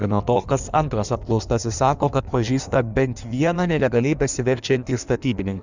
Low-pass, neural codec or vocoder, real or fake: 7.2 kHz; codec, 16 kHz in and 24 kHz out, 1.1 kbps, FireRedTTS-2 codec; fake